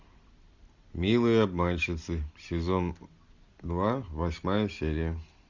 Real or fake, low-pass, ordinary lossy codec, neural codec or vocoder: real; 7.2 kHz; Opus, 64 kbps; none